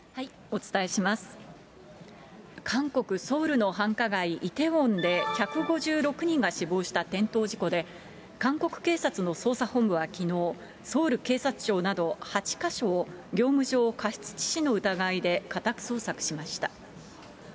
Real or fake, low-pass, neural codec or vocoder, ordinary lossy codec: real; none; none; none